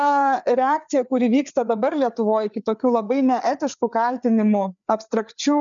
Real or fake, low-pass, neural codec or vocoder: fake; 7.2 kHz; codec, 16 kHz, 4 kbps, FreqCodec, larger model